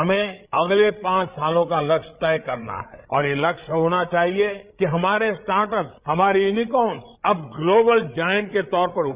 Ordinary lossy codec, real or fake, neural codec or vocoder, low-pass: Opus, 64 kbps; fake; codec, 16 kHz, 8 kbps, FreqCodec, larger model; 3.6 kHz